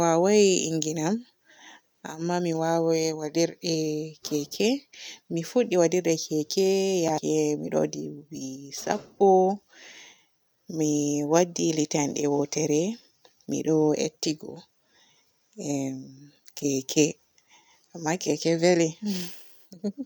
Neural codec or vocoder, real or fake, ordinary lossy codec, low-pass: none; real; none; none